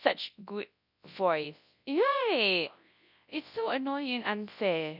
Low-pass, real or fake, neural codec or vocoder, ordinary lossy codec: 5.4 kHz; fake; codec, 24 kHz, 0.9 kbps, WavTokenizer, large speech release; none